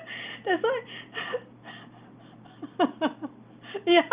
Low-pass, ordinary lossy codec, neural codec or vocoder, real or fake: 3.6 kHz; Opus, 32 kbps; none; real